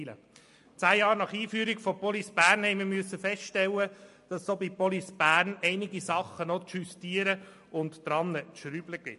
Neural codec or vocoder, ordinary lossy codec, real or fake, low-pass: none; MP3, 48 kbps; real; 14.4 kHz